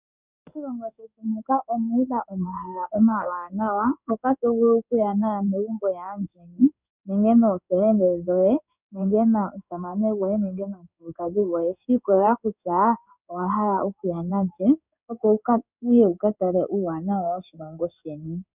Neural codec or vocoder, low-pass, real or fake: codec, 16 kHz in and 24 kHz out, 1 kbps, XY-Tokenizer; 3.6 kHz; fake